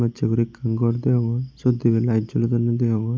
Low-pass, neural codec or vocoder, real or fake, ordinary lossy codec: none; none; real; none